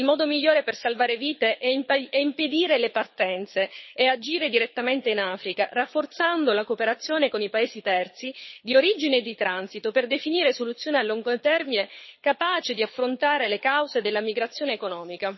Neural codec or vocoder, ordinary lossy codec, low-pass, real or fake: codec, 24 kHz, 6 kbps, HILCodec; MP3, 24 kbps; 7.2 kHz; fake